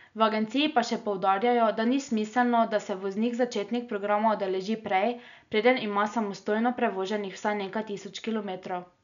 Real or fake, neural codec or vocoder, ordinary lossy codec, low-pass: real; none; none; 7.2 kHz